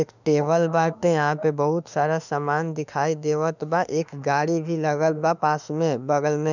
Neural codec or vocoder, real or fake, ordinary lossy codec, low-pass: autoencoder, 48 kHz, 32 numbers a frame, DAC-VAE, trained on Japanese speech; fake; none; 7.2 kHz